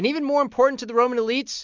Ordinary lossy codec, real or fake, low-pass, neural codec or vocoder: MP3, 64 kbps; real; 7.2 kHz; none